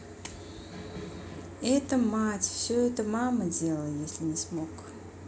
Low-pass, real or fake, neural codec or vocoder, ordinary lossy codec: none; real; none; none